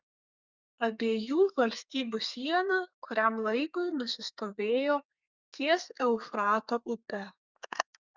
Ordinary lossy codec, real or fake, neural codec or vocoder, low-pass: Opus, 64 kbps; fake; codec, 32 kHz, 1.9 kbps, SNAC; 7.2 kHz